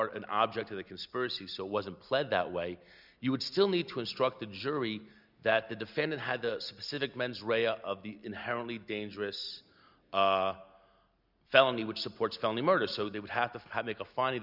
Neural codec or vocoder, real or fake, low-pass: none; real; 5.4 kHz